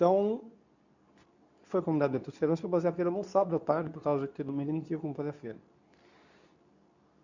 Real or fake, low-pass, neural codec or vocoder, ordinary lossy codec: fake; 7.2 kHz; codec, 24 kHz, 0.9 kbps, WavTokenizer, medium speech release version 2; none